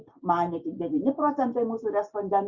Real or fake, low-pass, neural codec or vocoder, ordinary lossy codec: real; 7.2 kHz; none; Opus, 64 kbps